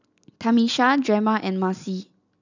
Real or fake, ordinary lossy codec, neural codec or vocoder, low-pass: real; none; none; 7.2 kHz